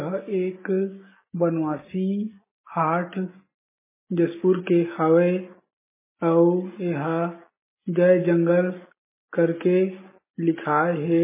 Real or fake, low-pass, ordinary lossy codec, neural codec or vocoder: real; 3.6 kHz; MP3, 16 kbps; none